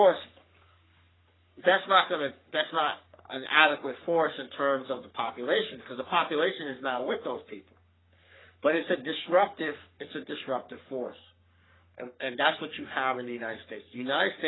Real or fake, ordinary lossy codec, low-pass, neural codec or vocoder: fake; AAC, 16 kbps; 7.2 kHz; codec, 44.1 kHz, 3.4 kbps, Pupu-Codec